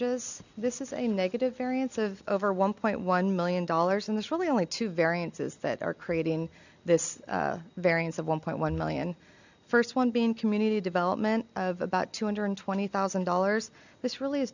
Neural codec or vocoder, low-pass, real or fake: none; 7.2 kHz; real